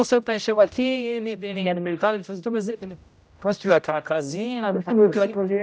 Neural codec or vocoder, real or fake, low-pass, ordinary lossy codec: codec, 16 kHz, 0.5 kbps, X-Codec, HuBERT features, trained on general audio; fake; none; none